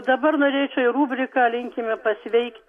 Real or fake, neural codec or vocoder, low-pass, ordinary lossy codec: real; none; 14.4 kHz; AAC, 64 kbps